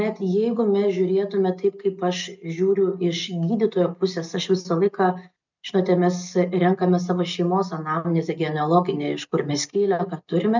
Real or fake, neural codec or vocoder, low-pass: real; none; 7.2 kHz